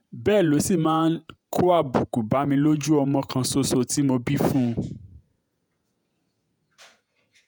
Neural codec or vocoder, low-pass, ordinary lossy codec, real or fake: vocoder, 48 kHz, 128 mel bands, Vocos; none; none; fake